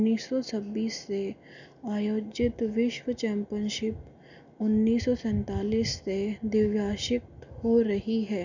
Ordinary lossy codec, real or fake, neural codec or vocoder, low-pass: none; real; none; 7.2 kHz